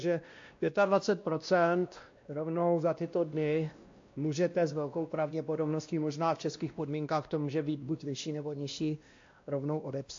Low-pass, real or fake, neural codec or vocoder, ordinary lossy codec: 7.2 kHz; fake; codec, 16 kHz, 1 kbps, X-Codec, WavLM features, trained on Multilingual LibriSpeech; AAC, 48 kbps